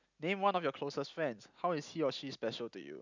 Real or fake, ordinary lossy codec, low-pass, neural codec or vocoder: real; none; 7.2 kHz; none